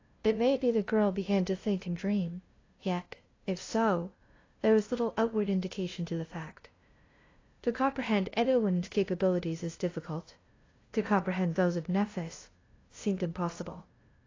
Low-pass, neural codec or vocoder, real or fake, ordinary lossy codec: 7.2 kHz; codec, 16 kHz, 0.5 kbps, FunCodec, trained on LibriTTS, 25 frames a second; fake; AAC, 32 kbps